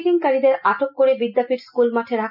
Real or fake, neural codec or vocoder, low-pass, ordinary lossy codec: real; none; 5.4 kHz; none